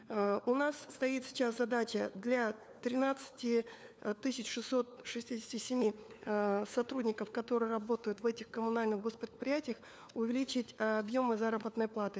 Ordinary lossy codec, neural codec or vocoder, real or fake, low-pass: none; codec, 16 kHz, 4 kbps, FunCodec, trained on LibriTTS, 50 frames a second; fake; none